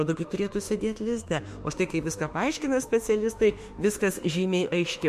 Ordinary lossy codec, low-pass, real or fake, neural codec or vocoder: MP3, 64 kbps; 14.4 kHz; fake; autoencoder, 48 kHz, 32 numbers a frame, DAC-VAE, trained on Japanese speech